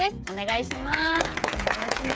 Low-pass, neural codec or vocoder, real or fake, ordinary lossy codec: none; codec, 16 kHz, 8 kbps, FreqCodec, smaller model; fake; none